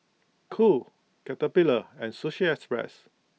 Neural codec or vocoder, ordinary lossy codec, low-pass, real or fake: none; none; none; real